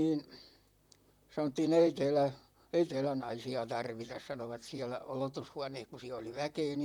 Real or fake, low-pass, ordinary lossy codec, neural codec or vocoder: fake; 19.8 kHz; none; vocoder, 44.1 kHz, 128 mel bands, Pupu-Vocoder